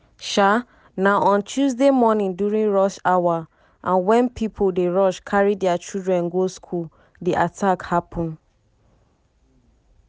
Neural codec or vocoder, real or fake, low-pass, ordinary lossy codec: none; real; none; none